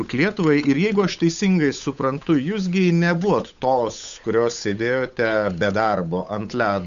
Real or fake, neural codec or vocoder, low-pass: fake; codec, 16 kHz, 8 kbps, FunCodec, trained on Chinese and English, 25 frames a second; 7.2 kHz